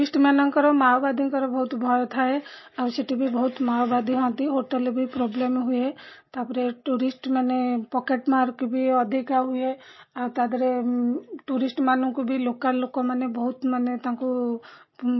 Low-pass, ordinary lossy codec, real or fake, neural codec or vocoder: 7.2 kHz; MP3, 24 kbps; real; none